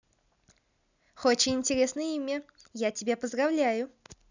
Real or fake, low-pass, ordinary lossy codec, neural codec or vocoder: real; 7.2 kHz; none; none